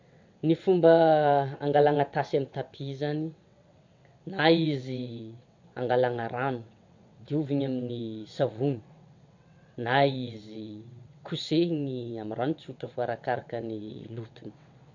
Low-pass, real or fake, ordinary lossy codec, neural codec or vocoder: 7.2 kHz; fake; MP3, 48 kbps; vocoder, 22.05 kHz, 80 mel bands, WaveNeXt